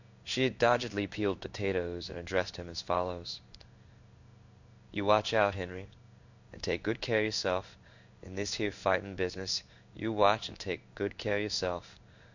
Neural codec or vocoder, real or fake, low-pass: codec, 16 kHz in and 24 kHz out, 1 kbps, XY-Tokenizer; fake; 7.2 kHz